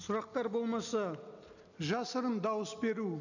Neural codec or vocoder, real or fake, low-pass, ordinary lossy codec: none; real; 7.2 kHz; none